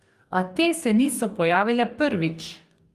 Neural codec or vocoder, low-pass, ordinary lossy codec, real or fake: codec, 44.1 kHz, 2.6 kbps, DAC; 14.4 kHz; Opus, 32 kbps; fake